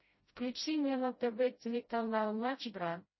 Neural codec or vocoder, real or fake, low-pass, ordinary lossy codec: codec, 16 kHz, 0.5 kbps, FreqCodec, smaller model; fake; 7.2 kHz; MP3, 24 kbps